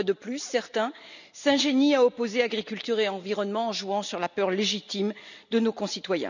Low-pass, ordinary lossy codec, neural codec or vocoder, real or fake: 7.2 kHz; none; none; real